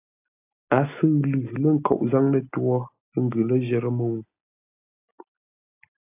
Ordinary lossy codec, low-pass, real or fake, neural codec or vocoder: AAC, 32 kbps; 3.6 kHz; real; none